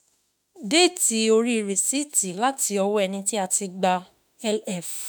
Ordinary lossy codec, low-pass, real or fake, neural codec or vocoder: none; none; fake; autoencoder, 48 kHz, 32 numbers a frame, DAC-VAE, trained on Japanese speech